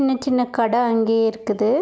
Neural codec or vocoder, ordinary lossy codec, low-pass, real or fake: none; none; none; real